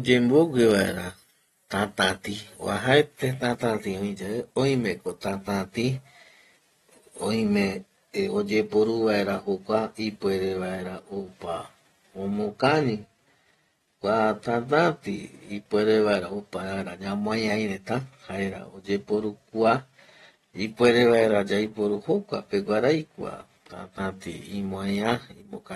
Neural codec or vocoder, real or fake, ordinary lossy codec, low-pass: none; real; AAC, 32 kbps; 19.8 kHz